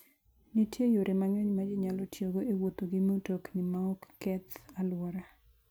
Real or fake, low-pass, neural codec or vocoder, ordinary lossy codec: real; none; none; none